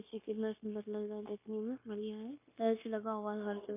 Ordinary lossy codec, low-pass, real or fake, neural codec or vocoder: MP3, 24 kbps; 3.6 kHz; fake; codec, 16 kHz, 0.9 kbps, LongCat-Audio-Codec